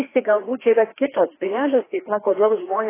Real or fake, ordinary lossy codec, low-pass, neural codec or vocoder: fake; AAC, 16 kbps; 3.6 kHz; codec, 16 kHz, 2 kbps, FreqCodec, larger model